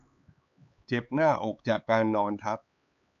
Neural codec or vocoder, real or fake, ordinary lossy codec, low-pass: codec, 16 kHz, 4 kbps, X-Codec, HuBERT features, trained on LibriSpeech; fake; MP3, 64 kbps; 7.2 kHz